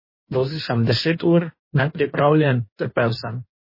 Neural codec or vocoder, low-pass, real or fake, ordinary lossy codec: codec, 16 kHz in and 24 kHz out, 1.1 kbps, FireRedTTS-2 codec; 5.4 kHz; fake; MP3, 24 kbps